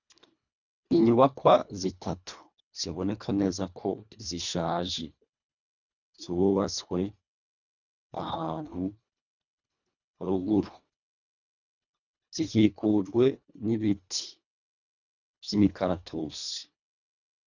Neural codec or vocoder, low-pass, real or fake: codec, 24 kHz, 1.5 kbps, HILCodec; 7.2 kHz; fake